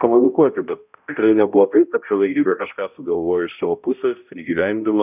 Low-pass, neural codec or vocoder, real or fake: 3.6 kHz; codec, 16 kHz, 0.5 kbps, X-Codec, HuBERT features, trained on balanced general audio; fake